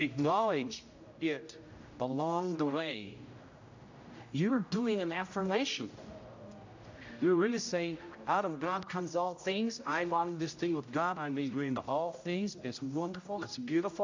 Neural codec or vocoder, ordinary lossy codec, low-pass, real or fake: codec, 16 kHz, 0.5 kbps, X-Codec, HuBERT features, trained on general audio; AAC, 48 kbps; 7.2 kHz; fake